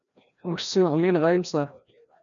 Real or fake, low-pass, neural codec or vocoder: fake; 7.2 kHz; codec, 16 kHz, 1 kbps, FreqCodec, larger model